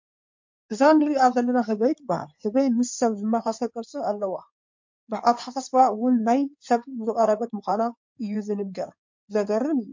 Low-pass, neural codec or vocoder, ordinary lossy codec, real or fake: 7.2 kHz; codec, 16 kHz in and 24 kHz out, 2.2 kbps, FireRedTTS-2 codec; MP3, 48 kbps; fake